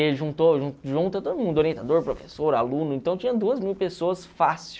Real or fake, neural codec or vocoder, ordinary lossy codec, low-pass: real; none; none; none